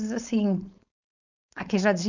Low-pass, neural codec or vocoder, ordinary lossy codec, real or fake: 7.2 kHz; codec, 16 kHz, 4.8 kbps, FACodec; none; fake